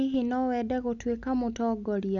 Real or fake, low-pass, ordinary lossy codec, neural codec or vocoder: real; 7.2 kHz; none; none